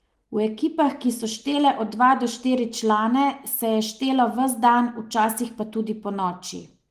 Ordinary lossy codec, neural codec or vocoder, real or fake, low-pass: Opus, 24 kbps; none; real; 14.4 kHz